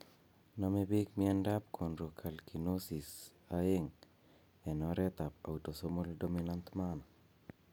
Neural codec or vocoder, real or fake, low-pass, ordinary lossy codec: none; real; none; none